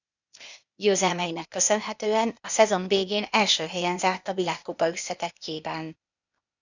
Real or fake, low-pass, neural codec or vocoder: fake; 7.2 kHz; codec, 16 kHz, 0.8 kbps, ZipCodec